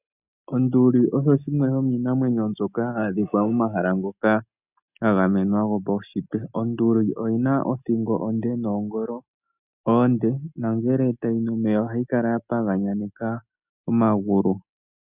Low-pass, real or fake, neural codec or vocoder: 3.6 kHz; real; none